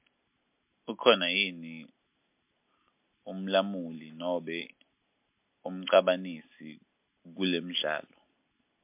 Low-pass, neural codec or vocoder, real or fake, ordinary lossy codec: 3.6 kHz; none; real; MP3, 32 kbps